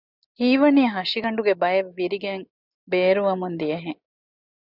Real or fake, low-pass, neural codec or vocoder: real; 5.4 kHz; none